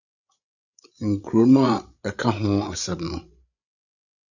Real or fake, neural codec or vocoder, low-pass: fake; codec, 16 kHz, 8 kbps, FreqCodec, larger model; 7.2 kHz